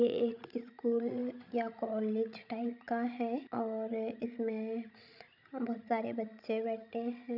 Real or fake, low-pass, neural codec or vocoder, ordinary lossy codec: fake; 5.4 kHz; codec, 16 kHz, 16 kbps, FreqCodec, larger model; none